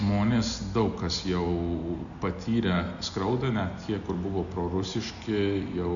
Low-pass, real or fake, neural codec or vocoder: 7.2 kHz; real; none